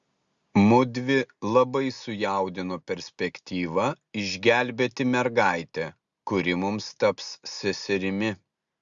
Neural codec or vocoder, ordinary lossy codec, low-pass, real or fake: none; Opus, 64 kbps; 7.2 kHz; real